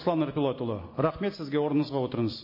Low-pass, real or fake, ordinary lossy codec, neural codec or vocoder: 5.4 kHz; real; MP3, 24 kbps; none